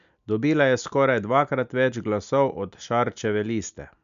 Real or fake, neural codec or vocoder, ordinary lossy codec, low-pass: real; none; none; 7.2 kHz